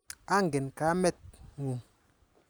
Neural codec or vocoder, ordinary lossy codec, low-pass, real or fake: none; none; none; real